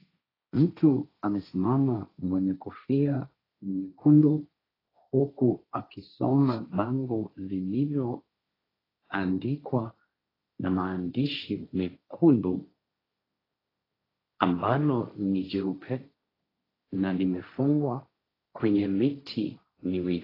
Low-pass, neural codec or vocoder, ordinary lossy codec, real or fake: 5.4 kHz; codec, 16 kHz, 1.1 kbps, Voila-Tokenizer; AAC, 24 kbps; fake